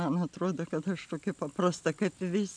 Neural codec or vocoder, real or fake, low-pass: none; real; 9.9 kHz